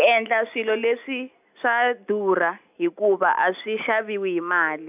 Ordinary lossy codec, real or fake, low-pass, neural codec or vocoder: AAC, 32 kbps; real; 3.6 kHz; none